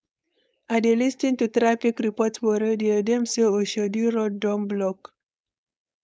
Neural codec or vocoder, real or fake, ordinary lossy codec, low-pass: codec, 16 kHz, 4.8 kbps, FACodec; fake; none; none